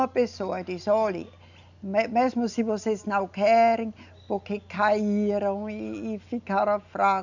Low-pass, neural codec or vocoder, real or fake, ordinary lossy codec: 7.2 kHz; none; real; none